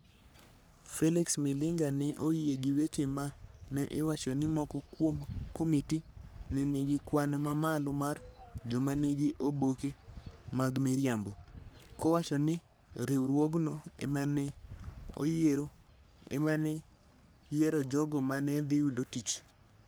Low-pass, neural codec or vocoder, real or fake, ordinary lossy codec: none; codec, 44.1 kHz, 3.4 kbps, Pupu-Codec; fake; none